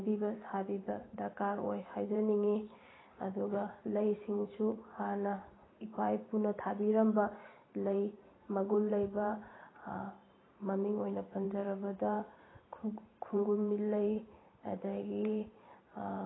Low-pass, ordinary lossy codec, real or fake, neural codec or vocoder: 7.2 kHz; AAC, 16 kbps; fake; vocoder, 44.1 kHz, 128 mel bands every 512 samples, BigVGAN v2